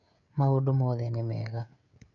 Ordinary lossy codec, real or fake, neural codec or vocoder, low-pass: none; fake; codec, 16 kHz, 16 kbps, FreqCodec, smaller model; 7.2 kHz